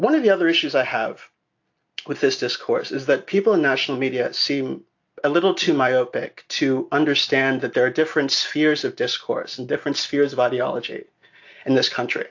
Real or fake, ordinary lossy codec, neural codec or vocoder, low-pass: real; AAC, 48 kbps; none; 7.2 kHz